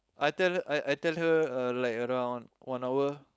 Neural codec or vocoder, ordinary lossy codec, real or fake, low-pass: codec, 16 kHz, 4.8 kbps, FACodec; none; fake; none